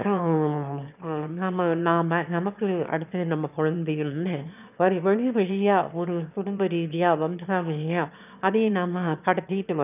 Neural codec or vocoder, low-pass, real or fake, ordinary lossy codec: autoencoder, 22.05 kHz, a latent of 192 numbers a frame, VITS, trained on one speaker; 3.6 kHz; fake; none